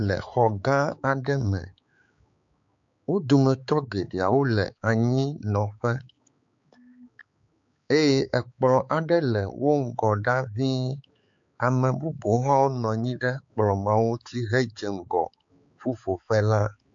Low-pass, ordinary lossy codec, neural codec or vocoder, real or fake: 7.2 kHz; AAC, 64 kbps; codec, 16 kHz, 4 kbps, X-Codec, HuBERT features, trained on balanced general audio; fake